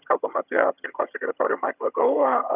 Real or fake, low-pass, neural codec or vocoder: fake; 3.6 kHz; vocoder, 22.05 kHz, 80 mel bands, HiFi-GAN